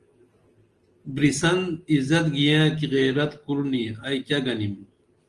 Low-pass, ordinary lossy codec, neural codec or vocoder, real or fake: 10.8 kHz; Opus, 24 kbps; none; real